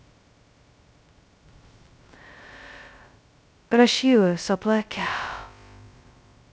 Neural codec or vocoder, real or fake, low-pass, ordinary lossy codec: codec, 16 kHz, 0.2 kbps, FocalCodec; fake; none; none